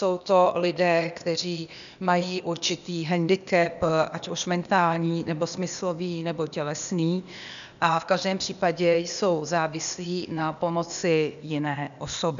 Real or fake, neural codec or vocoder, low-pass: fake; codec, 16 kHz, 0.8 kbps, ZipCodec; 7.2 kHz